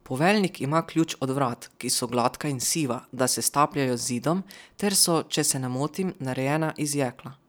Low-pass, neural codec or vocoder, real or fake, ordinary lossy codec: none; none; real; none